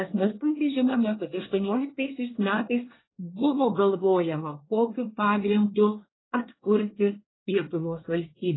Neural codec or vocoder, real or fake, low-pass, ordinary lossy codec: codec, 24 kHz, 1 kbps, SNAC; fake; 7.2 kHz; AAC, 16 kbps